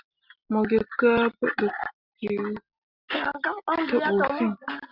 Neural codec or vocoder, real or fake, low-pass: none; real; 5.4 kHz